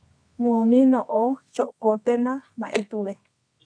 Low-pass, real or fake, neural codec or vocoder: 9.9 kHz; fake; codec, 24 kHz, 0.9 kbps, WavTokenizer, medium music audio release